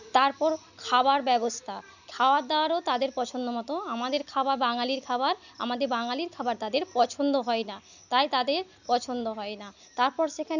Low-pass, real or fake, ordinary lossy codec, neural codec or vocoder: 7.2 kHz; real; none; none